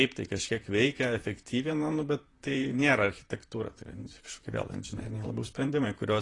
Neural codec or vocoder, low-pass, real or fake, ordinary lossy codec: vocoder, 44.1 kHz, 128 mel bands, Pupu-Vocoder; 10.8 kHz; fake; AAC, 32 kbps